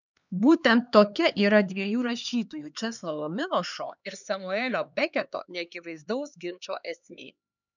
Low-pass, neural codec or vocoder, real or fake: 7.2 kHz; codec, 16 kHz, 2 kbps, X-Codec, HuBERT features, trained on LibriSpeech; fake